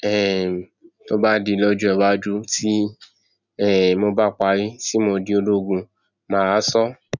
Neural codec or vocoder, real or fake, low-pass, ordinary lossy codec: none; real; 7.2 kHz; none